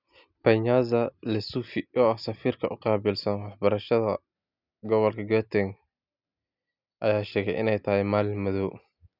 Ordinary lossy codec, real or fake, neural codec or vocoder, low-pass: none; real; none; 5.4 kHz